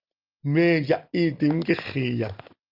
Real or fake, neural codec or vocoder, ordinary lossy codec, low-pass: real; none; Opus, 24 kbps; 5.4 kHz